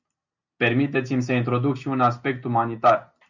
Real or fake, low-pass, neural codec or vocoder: real; 7.2 kHz; none